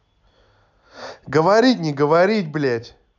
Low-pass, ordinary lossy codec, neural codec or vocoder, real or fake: 7.2 kHz; none; none; real